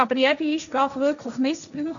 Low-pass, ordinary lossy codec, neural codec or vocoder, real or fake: 7.2 kHz; none; codec, 16 kHz, 1.1 kbps, Voila-Tokenizer; fake